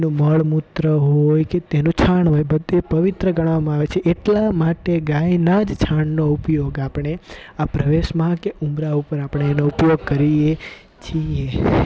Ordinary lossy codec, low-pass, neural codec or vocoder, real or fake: none; none; none; real